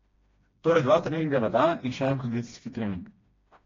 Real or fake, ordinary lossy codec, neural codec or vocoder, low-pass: fake; AAC, 32 kbps; codec, 16 kHz, 1 kbps, FreqCodec, smaller model; 7.2 kHz